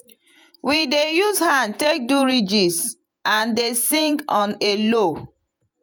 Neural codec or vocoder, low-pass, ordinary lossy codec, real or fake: vocoder, 48 kHz, 128 mel bands, Vocos; none; none; fake